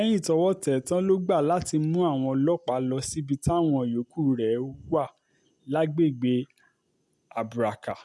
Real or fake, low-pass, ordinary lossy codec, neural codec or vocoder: real; none; none; none